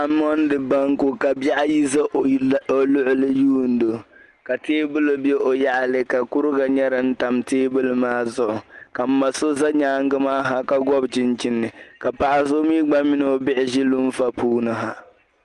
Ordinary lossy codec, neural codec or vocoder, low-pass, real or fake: Opus, 24 kbps; none; 10.8 kHz; real